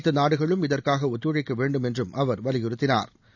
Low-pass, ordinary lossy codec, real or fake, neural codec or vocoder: 7.2 kHz; none; real; none